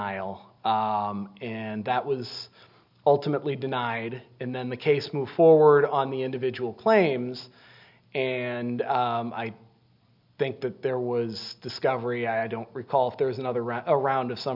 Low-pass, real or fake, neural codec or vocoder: 5.4 kHz; real; none